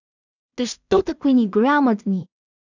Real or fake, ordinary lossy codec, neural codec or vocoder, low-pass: fake; none; codec, 16 kHz in and 24 kHz out, 0.4 kbps, LongCat-Audio-Codec, two codebook decoder; 7.2 kHz